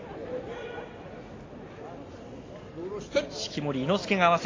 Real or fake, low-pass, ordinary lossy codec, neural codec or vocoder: real; 7.2 kHz; AAC, 32 kbps; none